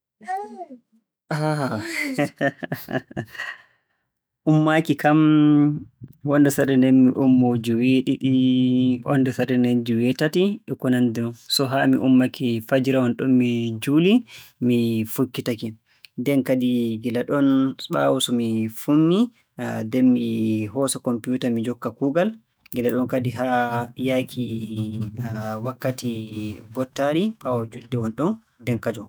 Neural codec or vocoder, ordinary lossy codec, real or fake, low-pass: autoencoder, 48 kHz, 128 numbers a frame, DAC-VAE, trained on Japanese speech; none; fake; none